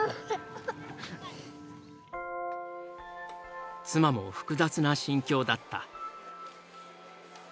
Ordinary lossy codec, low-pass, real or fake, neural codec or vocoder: none; none; real; none